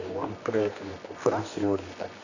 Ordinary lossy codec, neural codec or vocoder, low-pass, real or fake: none; codec, 24 kHz, 0.9 kbps, WavTokenizer, medium music audio release; 7.2 kHz; fake